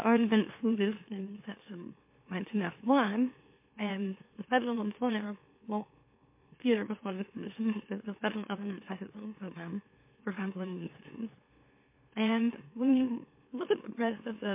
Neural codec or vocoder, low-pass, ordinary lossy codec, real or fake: autoencoder, 44.1 kHz, a latent of 192 numbers a frame, MeloTTS; 3.6 kHz; MP3, 24 kbps; fake